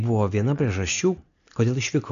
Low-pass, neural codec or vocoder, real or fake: 7.2 kHz; none; real